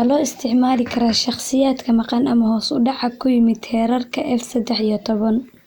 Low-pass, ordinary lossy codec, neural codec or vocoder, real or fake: none; none; none; real